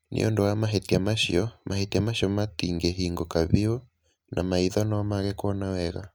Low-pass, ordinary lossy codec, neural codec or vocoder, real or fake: none; none; none; real